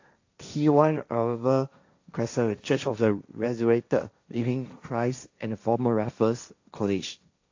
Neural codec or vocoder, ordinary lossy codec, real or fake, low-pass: codec, 16 kHz, 1.1 kbps, Voila-Tokenizer; AAC, 48 kbps; fake; 7.2 kHz